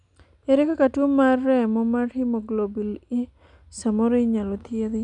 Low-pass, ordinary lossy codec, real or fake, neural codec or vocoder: 9.9 kHz; MP3, 96 kbps; real; none